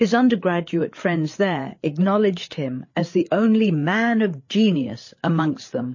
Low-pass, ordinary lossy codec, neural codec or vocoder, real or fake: 7.2 kHz; MP3, 32 kbps; codec, 16 kHz, 8 kbps, FreqCodec, larger model; fake